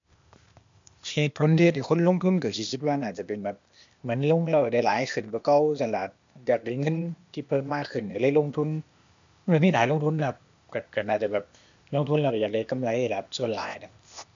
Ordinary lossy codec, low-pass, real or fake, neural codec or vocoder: MP3, 64 kbps; 7.2 kHz; fake; codec, 16 kHz, 0.8 kbps, ZipCodec